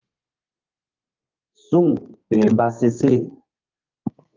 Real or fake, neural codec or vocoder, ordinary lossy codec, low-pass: fake; codec, 32 kHz, 1.9 kbps, SNAC; Opus, 32 kbps; 7.2 kHz